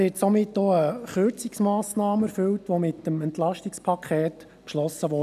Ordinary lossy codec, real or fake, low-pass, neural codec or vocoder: none; real; 14.4 kHz; none